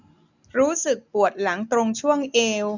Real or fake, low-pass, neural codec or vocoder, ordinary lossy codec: real; 7.2 kHz; none; none